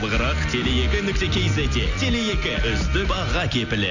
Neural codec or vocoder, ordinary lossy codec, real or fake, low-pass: none; none; real; 7.2 kHz